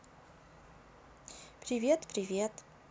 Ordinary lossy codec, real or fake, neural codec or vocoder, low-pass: none; real; none; none